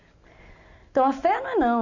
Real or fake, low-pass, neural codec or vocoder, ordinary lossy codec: real; 7.2 kHz; none; none